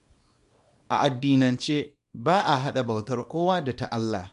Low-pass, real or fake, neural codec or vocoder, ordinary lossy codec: 10.8 kHz; fake; codec, 24 kHz, 0.9 kbps, WavTokenizer, small release; MP3, 64 kbps